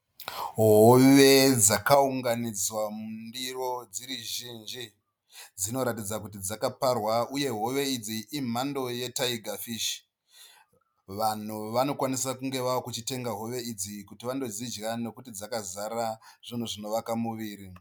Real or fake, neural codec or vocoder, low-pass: real; none; 19.8 kHz